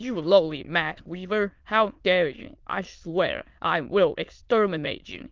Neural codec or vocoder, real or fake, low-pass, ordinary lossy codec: autoencoder, 22.05 kHz, a latent of 192 numbers a frame, VITS, trained on many speakers; fake; 7.2 kHz; Opus, 24 kbps